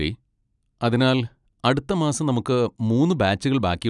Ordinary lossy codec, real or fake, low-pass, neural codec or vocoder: none; real; 10.8 kHz; none